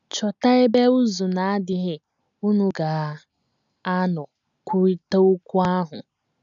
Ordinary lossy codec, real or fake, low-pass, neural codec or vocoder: none; real; 7.2 kHz; none